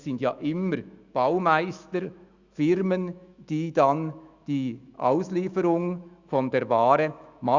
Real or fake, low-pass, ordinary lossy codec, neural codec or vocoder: fake; 7.2 kHz; Opus, 64 kbps; autoencoder, 48 kHz, 128 numbers a frame, DAC-VAE, trained on Japanese speech